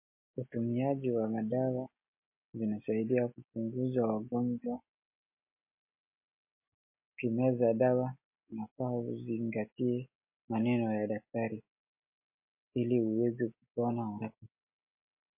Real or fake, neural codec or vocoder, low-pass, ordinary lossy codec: real; none; 3.6 kHz; MP3, 24 kbps